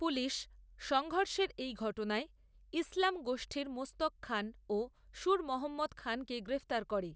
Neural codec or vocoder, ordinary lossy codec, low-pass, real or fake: none; none; none; real